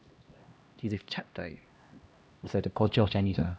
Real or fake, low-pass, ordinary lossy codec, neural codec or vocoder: fake; none; none; codec, 16 kHz, 1 kbps, X-Codec, HuBERT features, trained on LibriSpeech